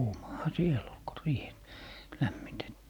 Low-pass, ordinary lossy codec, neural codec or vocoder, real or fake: 19.8 kHz; none; none; real